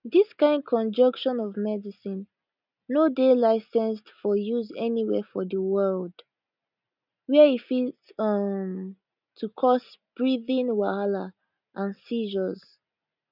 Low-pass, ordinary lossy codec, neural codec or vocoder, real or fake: 5.4 kHz; none; none; real